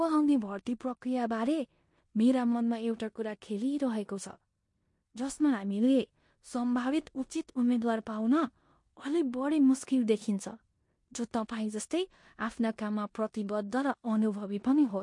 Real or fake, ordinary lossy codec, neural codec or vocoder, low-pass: fake; MP3, 48 kbps; codec, 16 kHz in and 24 kHz out, 0.9 kbps, LongCat-Audio-Codec, four codebook decoder; 10.8 kHz